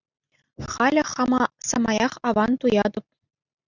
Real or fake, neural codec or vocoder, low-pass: real; none; 7.2 kHz